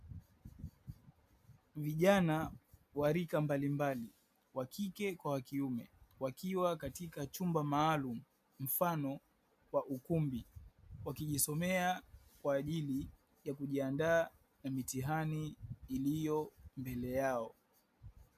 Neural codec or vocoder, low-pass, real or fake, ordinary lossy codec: none; 14.4 kHz; real; MP3, 96 kbps